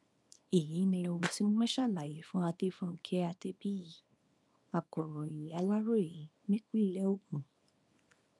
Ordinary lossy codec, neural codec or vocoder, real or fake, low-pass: none; codec, 24 kHz, 0.9 kbps, WavTokenizer, small release; fake; none